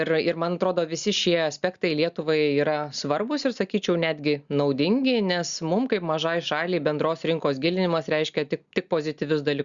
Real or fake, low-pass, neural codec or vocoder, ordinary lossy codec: real; 7.2 kHz; none; Opus, 64 kbps